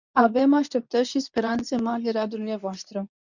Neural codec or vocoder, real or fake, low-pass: codec, 24 kHz, 0.9 kbps, WavTokenizer, medium speech release version 2; fake; 7.2 kHz